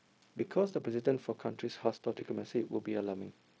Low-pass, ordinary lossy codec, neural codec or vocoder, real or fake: none; none; codec, 16 kHz, 0.4 kbps, LongCat-Audio-Codec; fake